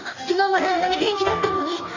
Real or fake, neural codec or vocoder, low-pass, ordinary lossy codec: fake; codec, 44.1 kHz, 2.6 kbps, DAC; 7.2 kHz; none